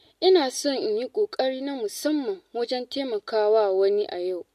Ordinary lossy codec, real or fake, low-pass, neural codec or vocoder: MP3, 64 kbps; real; 14.4 kHz; none